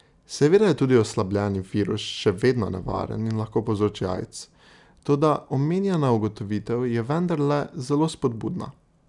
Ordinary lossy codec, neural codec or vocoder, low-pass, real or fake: none; none; 10.8 kHz; real